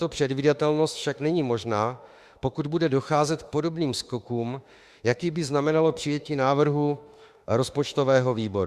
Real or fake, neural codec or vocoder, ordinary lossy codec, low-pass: fake; autoencoder, 48 kHz, 32 numbers a frame, DAC-VAE, trained on Japanese speech; Opus, 64 kbps; 14.4 kHz